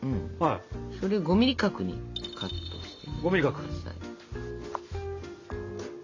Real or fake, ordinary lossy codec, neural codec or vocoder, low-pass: real; AAC, 48 kbps; none; 7.2 kHz